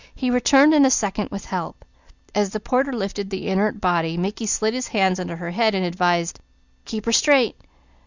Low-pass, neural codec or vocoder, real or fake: 7.2 kHz; none; real